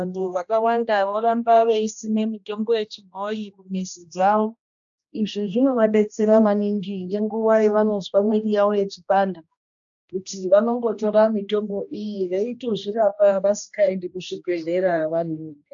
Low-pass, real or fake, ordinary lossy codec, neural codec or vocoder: 7.2 kHz; fake; AAC, 64 kbps; codec, 16 kHz, 1 kbps, X-Codec, HuBERT features, trained on general audio